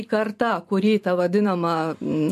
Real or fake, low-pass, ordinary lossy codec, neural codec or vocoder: fake; 14.4 kHz; MP3, 64 kbps; vocoder, 44.1 kHz, 128 mel bands every 512 samples, BigVGAN v2